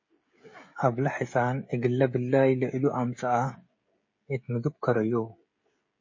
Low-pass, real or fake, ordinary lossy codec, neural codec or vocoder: 7.2 kHz; fake; MP3, 32 kbps; codec, 16 kHz, 16 kbps, FreqCodec, smaller model